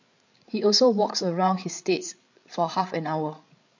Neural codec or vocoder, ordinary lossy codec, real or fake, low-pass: codec, 16 kHz, 8 kbps, FreqCodec, larger model; MP3, 48 kbps; fake; 7.2 kHz